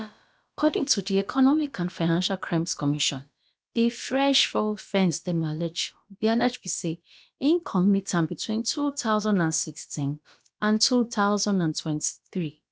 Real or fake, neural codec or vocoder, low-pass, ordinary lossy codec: fake; codec, 16 kHz, about 1 kbps, DyCAST, with the encoder's durations; none; none